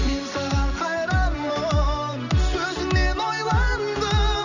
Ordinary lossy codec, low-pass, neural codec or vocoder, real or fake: none; 7.2 kHz; none; real